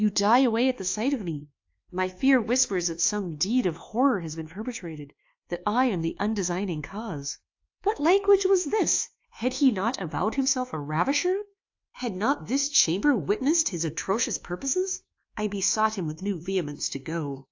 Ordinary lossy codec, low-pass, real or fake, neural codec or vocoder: AAC, 48 kbps; 7.2 kHz; fake; codec, 24 kHz, 1.2 kbps, DualCodec